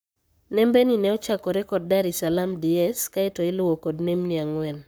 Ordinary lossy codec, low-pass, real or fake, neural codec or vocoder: none; none; fake; codec, 44.1 kHz, 7.8 kbps, Pupu-Codec